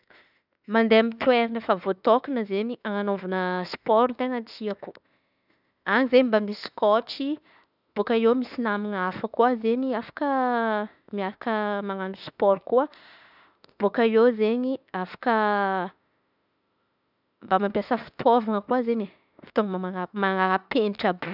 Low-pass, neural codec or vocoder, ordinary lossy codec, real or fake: 5.4 kHz; autoencoder, 48 kHz, 32 numbers a frame, DAC-VAE, trained on Japanese speech; none; fake